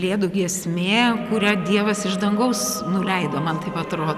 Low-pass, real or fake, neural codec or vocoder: 14.4 kHz; real; none